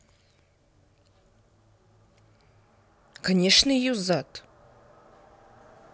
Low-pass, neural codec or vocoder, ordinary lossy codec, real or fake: none; none; none; real